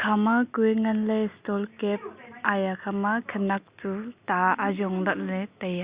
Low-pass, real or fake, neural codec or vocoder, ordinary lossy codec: 3.6 kHz; real; none; Opus, 64 kbps